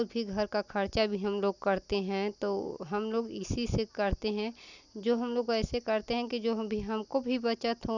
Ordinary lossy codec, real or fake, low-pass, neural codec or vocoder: none; real; 7.2 kHz; none